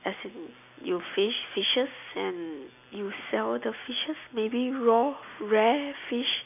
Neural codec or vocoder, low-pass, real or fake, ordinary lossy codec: none; 3.6 kHz; real; none